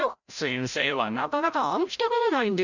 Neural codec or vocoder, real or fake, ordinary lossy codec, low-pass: codec, 16 kHz, 0.5 kbps, FreqCodec, larger model; fake; none; 7.2 kHz